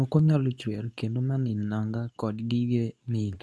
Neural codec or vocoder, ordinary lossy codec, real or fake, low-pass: codec, 24 kHz, 0.9 kbps, WavTokenizer, medium speech release version 2; none; fake; none